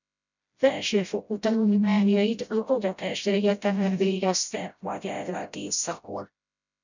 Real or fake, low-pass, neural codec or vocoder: fake; 7.2 kHz; codec, 16 kHz, 0.5 kbps, FreqCodec, smaller model